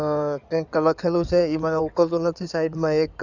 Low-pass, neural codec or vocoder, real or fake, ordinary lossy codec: 7.2 kHz; codec, 16 kHz in and 24 kHz out, 2.2 kbps, FireRedTTS-2 codec; fake; none